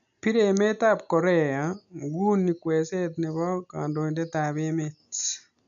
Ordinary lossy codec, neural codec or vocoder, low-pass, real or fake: none; none; 7.2 kHz; real